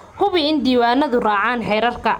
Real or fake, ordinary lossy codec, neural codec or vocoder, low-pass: real; MP3, 96 kbps; none; 19.8 kHz